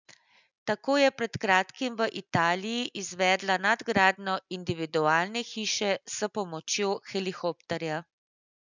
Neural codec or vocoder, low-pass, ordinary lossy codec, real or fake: none; 7.2 kHz; none; real